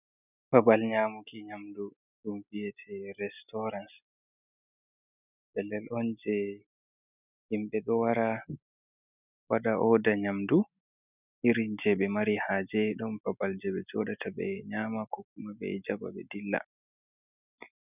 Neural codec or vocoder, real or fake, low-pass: none; real; 3.6 kHz